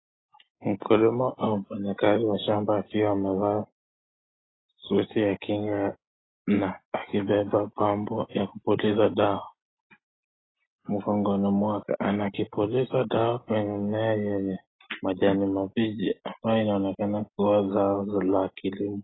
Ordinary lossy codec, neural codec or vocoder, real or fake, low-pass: AAC, 16 kbps; none; real; 7.2 kHz